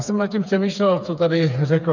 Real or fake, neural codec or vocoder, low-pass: fake; codec, 16 kHz, 4 kbps, FreqCodec, smaller model; 7.2 kHz